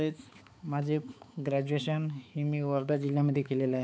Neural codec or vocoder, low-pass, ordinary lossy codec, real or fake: codec, 16 kHz, 4 kbps, X-Codec, HuBERT features, trained on balanced general audio; none; none; fake